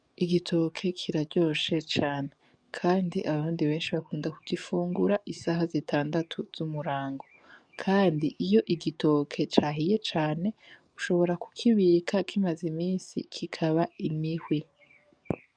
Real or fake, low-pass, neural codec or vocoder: fake; 9.9 kHz; codec, 44.1 kHz, 7.8 kbps, DAC